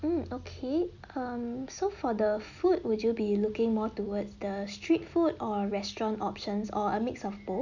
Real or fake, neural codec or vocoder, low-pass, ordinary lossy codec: real; none; 7.2 kHz; none